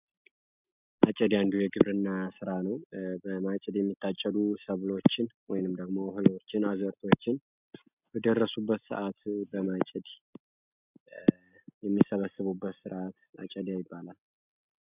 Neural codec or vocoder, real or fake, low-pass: none; real; 3.6 kHz